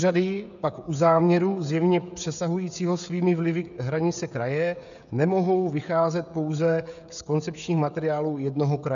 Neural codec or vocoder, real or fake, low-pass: codec, 16 kHz, 16 kbps, FreqCodec, smaller model; fake; 7.2 kHz